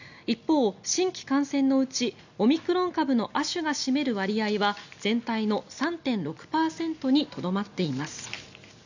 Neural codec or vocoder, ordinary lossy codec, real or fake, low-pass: none; none; real; 7.2 kHz